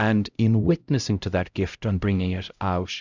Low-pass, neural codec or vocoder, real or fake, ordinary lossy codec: 7.2 kHz; codec, 16 kHz, 0.5 kbps, X-Codec, HuBERT features, trained on LibriSpeech; fake; Opus, 64 kbps